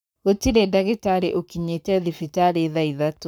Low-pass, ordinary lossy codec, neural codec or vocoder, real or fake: none; none; codec, 44.1 kHz, 7.8 kbps, Pupu-Codec; fake